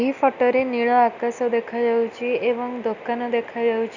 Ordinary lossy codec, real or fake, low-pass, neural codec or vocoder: none; real; 7.2 kHz; none